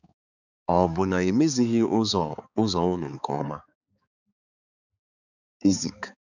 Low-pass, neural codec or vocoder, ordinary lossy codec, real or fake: 7.2 kHz; codec, 16 kHz, 4 kbps, X-Codec, HuBERT features, trained on balanced general audio; none; fake